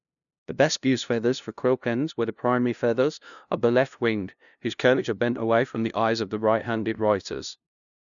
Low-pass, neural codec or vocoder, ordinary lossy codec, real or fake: 7.2 kHz; codec, 16 kHz, 0.5 kbps, FunCodec, trained on LibriTTS, 25 frames a second; none; fake